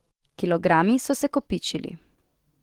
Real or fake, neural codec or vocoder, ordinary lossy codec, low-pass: fake; autoencoder, 48 kHz, 128 numbers a frame, DAC-VAE, trained on Japanese speech; Opus, 16 kbps; 19.8 kHz